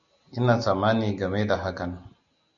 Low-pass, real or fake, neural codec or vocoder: 7.2 kHz; real; none